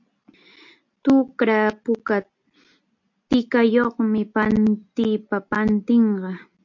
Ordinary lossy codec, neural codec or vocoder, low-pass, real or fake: MP3, 48 kbps; none; 7.2 kHz; real